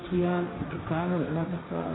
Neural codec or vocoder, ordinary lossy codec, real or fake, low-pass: codec, 16 kHz, 1.1 kbps, Voila-Tokenizer; AAC, 16 kbps; fake; 7.2 kHz